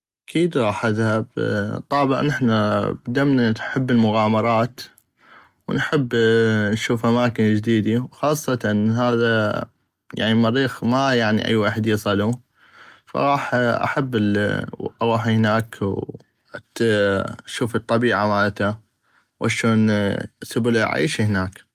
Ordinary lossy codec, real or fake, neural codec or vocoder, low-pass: Opus, 32 kbps; real; none; 14.4 kHz